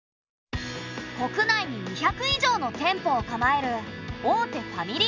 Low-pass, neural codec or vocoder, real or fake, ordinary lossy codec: 7.2 kHz; none; real; none